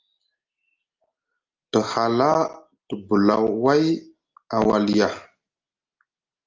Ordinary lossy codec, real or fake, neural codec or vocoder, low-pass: Opus, 24 kbps; real; none; 7.2 kHz